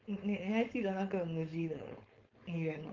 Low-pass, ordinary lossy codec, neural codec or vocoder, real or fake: 7.2 kHz; Opus, 24 kbps; codec, 16 kHz, 4.8 kbps, FACodec; fake